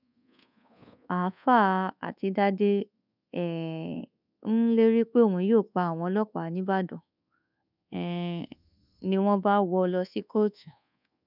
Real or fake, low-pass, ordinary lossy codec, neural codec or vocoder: fake; 5.4 kHz; none; codec, 24 kHz, 1.2 kbps, DualCodec